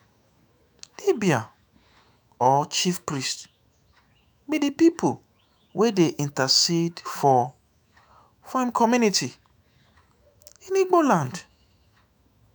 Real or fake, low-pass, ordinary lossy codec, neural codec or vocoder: fake; none; none; autoencoder, 48 kHz, 128 numbers a frame, DAC-VAE, trained on Japanese speech